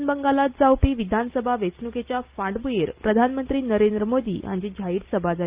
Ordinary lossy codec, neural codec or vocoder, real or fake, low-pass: Opus, 32 kbps; none; real; 3.6 kHz